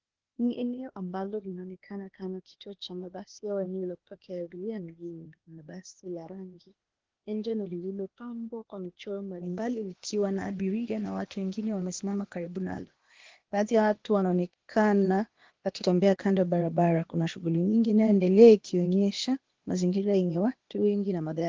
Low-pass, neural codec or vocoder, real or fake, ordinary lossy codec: 7.2 kHz; codec, 16 kHz, 0.8 kbps, ZipCodec; fake; Opus, 16 kbps